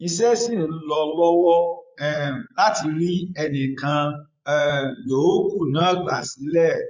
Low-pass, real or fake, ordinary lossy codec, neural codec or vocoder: 7.2 kHz; fake; MP3, 48 kbps; vocoder, 44.1 kHz, 80 mel bands, Vocos